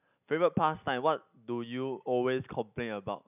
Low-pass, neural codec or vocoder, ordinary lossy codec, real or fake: 3.6 kHz; none; none; real